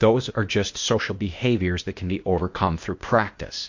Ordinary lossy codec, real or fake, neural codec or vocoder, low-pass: MP3, 48 kbps; fake; codec, 16 kHz, 0.8 kbps, ZipCodec; 7.2 kHz